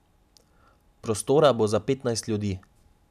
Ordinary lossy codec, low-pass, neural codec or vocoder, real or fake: none; 14.4 kHz; none; real